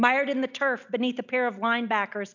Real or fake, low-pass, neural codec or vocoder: real; 7.2 kHz; none